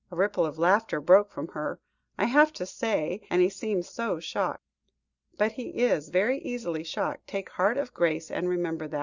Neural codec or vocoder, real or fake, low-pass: none; real; 7.2 kHz